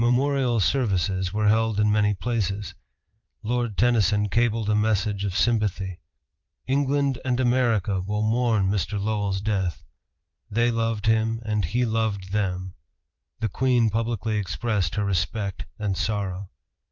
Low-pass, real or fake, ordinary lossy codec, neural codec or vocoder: 7.2 kHz; fake; Opus, 24 kbps; vocoder, 44.1 kHz, 128 mel bands every 512 samples, BigVGAN v2